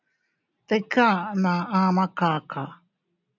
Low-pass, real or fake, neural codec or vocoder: 7.2 kHz; real; none